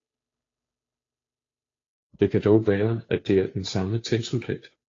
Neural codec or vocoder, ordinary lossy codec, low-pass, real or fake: codec, 16 kHz, 2 kbps, FunCodec, trained on Chinese and English, 25 frames a second; AAC, 32 kbps; 7.2 kHz; fake